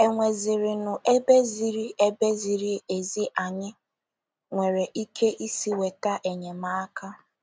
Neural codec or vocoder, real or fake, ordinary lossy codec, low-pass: none; real; none; none